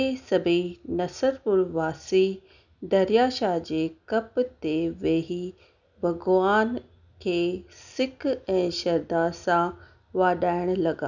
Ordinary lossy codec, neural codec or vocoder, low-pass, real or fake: none; none; 7.2 kHz; real